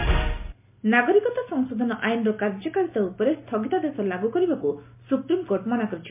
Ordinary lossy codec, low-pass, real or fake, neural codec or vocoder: MP3, 24 kbps; 3.6 kHz; real; none